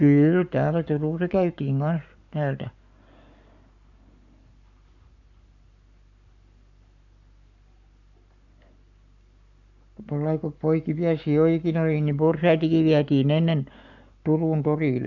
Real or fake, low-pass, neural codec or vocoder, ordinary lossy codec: fake; 7.2 kHz; codec, 44.1 kHz, 7.8 kbps, Pupu-Codec; none